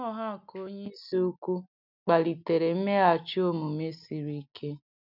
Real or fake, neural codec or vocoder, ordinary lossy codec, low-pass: real; none; none; 5.4 kHz